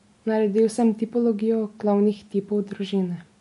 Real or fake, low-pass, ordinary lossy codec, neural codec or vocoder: real; 10.8 kHz; MP3, 48 kbps; none